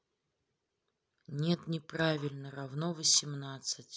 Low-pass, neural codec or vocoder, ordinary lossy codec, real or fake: none; none; none; real